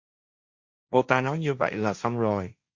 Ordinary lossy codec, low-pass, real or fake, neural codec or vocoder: Opus, 64 kbps; 7.2 kHz; fake; codec, 16 kHz, 1.1 kbps, Voila-Tokenizer